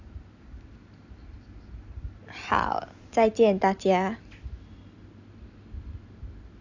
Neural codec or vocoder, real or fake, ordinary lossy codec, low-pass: none; real; AAC, 48 kbps; 7.2 kHz